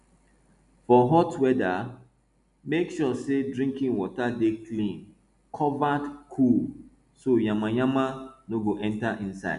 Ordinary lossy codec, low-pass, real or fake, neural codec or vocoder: none; 10.8 kHz; real; none